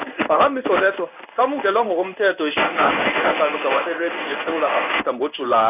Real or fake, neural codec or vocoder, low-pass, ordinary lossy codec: fake; codec, 16 kHz in and 24 kHz out, 1 kbps, XY-Tokenizer; 3.6 kHz; none